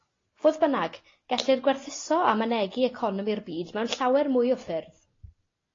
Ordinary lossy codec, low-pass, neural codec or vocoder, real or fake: AAC, 32 kbps; 7.2 kHz; none; real